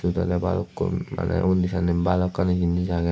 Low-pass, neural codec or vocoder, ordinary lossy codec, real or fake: none; none; none; real